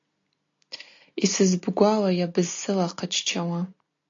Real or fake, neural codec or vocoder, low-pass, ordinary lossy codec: real; none; 7.2 kHz; AAC, 48 kbps